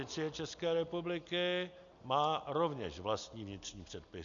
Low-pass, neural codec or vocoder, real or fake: 7.2 kHz; none; real